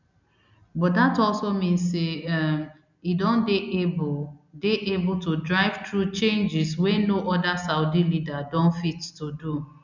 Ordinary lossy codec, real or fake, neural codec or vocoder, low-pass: none; real; none; 7.2 kHz